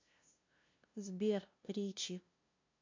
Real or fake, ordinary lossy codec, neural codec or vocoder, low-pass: fake; MP3, 48 kbps; codec, 16 kHz, 0.5 kbps, FunCodec, trained on LibriTTS, 25 frames a second; 7.2 kHz